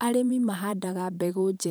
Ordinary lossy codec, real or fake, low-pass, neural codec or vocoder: none; fake; none; vocoder, 44.1 kHz, 128 mel bands every 512 samples, BigVGAN v2